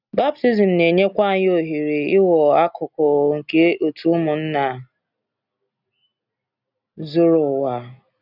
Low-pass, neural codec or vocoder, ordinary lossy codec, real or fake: 5.4 kHz; none; none; real